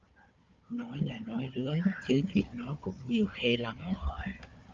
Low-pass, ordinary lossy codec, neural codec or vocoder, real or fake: 7.2 kHz; Opus, 24 kbps; codec, 16 kHz, 4 kbps, FunCodec, trained on Chinese and English, 50 frames a second; fake